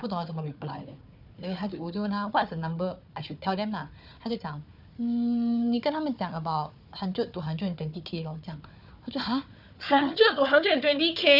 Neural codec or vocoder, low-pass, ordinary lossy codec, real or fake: codec, 16 kHz, 4 kbps, FunCodec, trained on Chinese and English, 50 frames a second; 5.4 kHz; none; fake